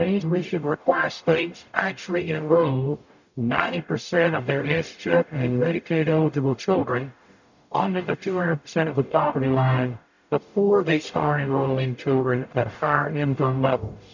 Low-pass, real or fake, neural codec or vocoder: 7.2 kHz; fake; codec, 44.1 kHz, 0.9 kbps, DAC